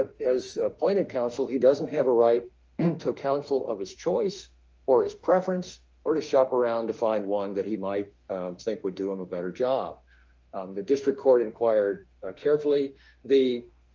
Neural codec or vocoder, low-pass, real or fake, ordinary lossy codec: autoencoder, 48 kHz, 32 numbers a frame, DAC-VAE, trained on Japanese speech; 7.2 kHz; fake; Opus, 24 kbps